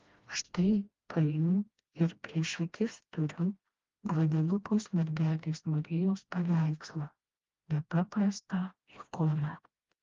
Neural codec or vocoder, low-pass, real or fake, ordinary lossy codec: codec, 16 kHz, 1 kbps, FreqCodec, smaller model; 7.2 kHz; fake; Opus, 32 kbps